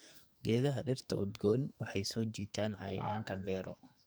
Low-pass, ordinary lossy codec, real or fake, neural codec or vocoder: none; none; fake; codec, 44.1 kHz, 2.6 kbps, SNAC